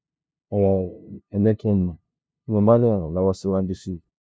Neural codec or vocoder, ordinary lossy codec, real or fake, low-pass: codec, 16 kHz, 0.5 kbps, FunCodec, trained on LibriTTS, 25 frames a second; none; fake; none